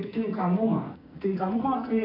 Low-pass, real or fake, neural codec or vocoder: 5.4 kHz; fake; codec, 44.1 kHz, 2.6 kbps, SNAC